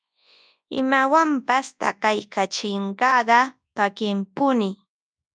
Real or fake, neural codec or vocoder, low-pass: fake; codec, 24 kHz, 0.9 kbps, WavTokenizer, large speech release; 9.9 kHz